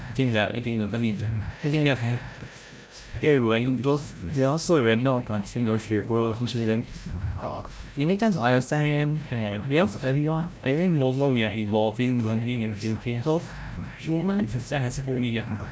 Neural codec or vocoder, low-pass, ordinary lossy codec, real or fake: codec, 16 kHz, 0.5 kbps, FreqCodec, larger model; none; none; fake